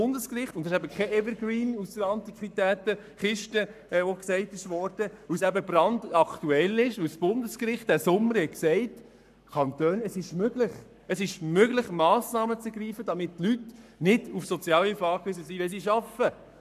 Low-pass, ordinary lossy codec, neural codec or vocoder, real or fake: 14.4 kHz; none; codec, 44.1 kHz, 7.8 kbps, Pupu-Codec; fake